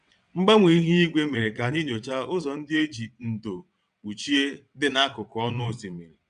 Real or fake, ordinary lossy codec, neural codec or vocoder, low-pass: fake; none; vocoder, 22.05 kHz, 80 mel bands, WaveNeXt; 9.9 kHz